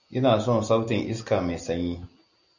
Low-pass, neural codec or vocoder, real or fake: 7.2 kHz; none; real